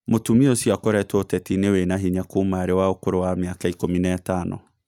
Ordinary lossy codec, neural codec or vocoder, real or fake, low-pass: none; none; real; 19.8 kHz